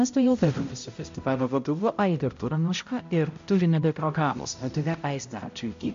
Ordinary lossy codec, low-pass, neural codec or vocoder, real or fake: MP3, 48 kbps; 7.2 kHz; codec, 16 kHz, 0.5 kbps, X-Codec, HuBERT features, trained on balanced general audio; fake